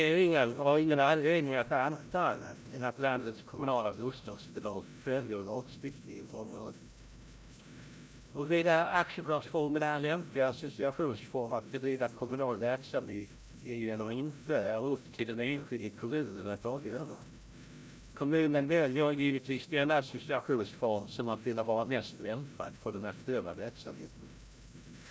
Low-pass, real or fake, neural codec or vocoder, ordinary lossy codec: none; fake; codec, 16 kHz, 0.5 kbps, FreqCodec, larger model; none